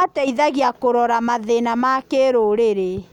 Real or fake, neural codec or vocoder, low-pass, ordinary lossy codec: real; none; 19.8 kHz; none